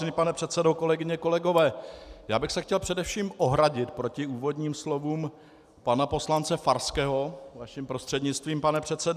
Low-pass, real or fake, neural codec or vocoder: 14.4 kHz; real; none